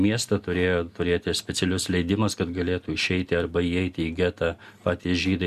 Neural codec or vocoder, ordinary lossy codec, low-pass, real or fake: none; Opus, 64 kbps; 14.4 kHz; real